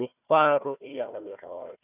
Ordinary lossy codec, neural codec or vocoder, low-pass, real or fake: none; codec, 16 kHz, 1 kbps, FunCodec, trained on Chinese and English, 50 frames a second; 3.6 kHz; fake